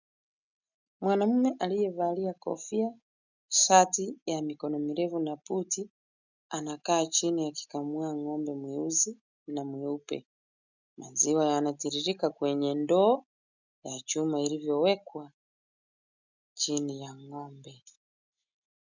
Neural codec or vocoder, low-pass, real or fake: none; 7.2 kHz; real